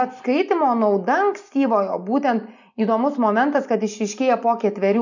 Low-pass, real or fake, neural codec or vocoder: 7.2 kHz; real; none